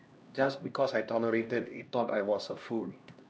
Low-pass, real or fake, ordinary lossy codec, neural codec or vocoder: none; fake; none; codec, 16 kHz, 1 kbps, X-Codec, HuBERT features, trained on LibriSpeech